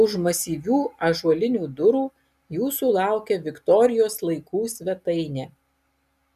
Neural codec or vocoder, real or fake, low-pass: none; real; 14.4 kHz